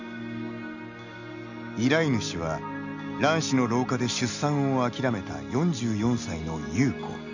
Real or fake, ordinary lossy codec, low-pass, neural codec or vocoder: real; none; 7.2 kHz; none